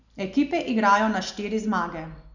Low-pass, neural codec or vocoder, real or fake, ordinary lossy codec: 7.2 kHz; none; real; none